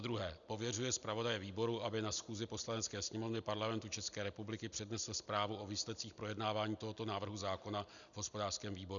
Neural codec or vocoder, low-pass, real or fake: none; 7.2 kHz; real